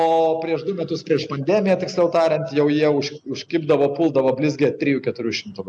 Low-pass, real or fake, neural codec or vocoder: 9.9 kHz; real; none